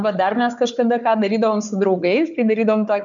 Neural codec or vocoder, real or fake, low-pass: codec, 16 kHz, 4 kbps, FunCodec, trained on Chinese and English, 50 frames a second; fake; 7.2 kHz